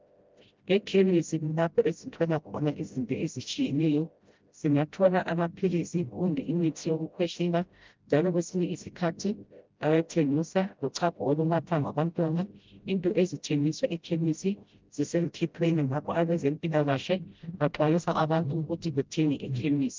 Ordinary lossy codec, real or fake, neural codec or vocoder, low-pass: Opus, 24 kbps; fake; codec, 16 kHz, 0.5 kbps, FreqCodec, smaller model; 7.2 kHz